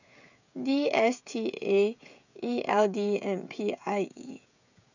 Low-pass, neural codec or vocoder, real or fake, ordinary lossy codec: 7.2 kHz; codec, 16 kHz, 16 kbps, FreqCodec, smaller model; fake; none